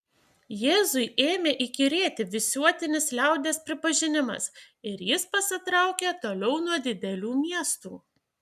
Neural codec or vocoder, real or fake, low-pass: none; real; 14.4 kHz